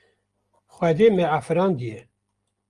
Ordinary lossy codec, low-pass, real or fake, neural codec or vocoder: Opus, 32 kbps; 10.8 kHz; real; none